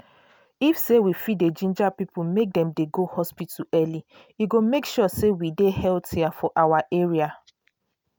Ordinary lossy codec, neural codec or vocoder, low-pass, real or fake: none; none; none; real